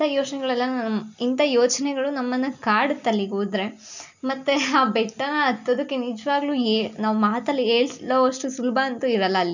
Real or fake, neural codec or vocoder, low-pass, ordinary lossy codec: real; none; 7.2 kHz; none